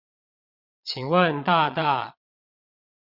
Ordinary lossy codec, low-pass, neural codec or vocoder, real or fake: Opus, 64 kbps; 5.4 kHz; vocoder, 22.05 kHz, 80 mel bands, Vocos; fake